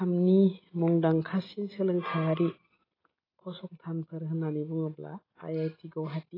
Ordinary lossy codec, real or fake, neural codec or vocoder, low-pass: AAC, 24 kbps; real; none; 5.4 kHz